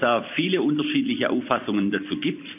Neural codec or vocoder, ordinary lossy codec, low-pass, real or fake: vocoder, 44.1 kHz, 128 mel bands every 256 samples, BigVGAN v2; AAC, 32 kbps; 3.6 kHz; fake